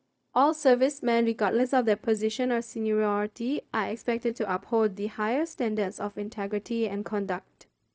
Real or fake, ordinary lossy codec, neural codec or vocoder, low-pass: fake; none; codec, 16 kHz, 0.4 kbps, LongCat-Audio-Codec; none